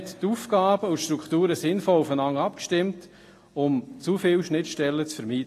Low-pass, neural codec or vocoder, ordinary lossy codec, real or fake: 14.4 kHz; none; AAC, 48 kbps; real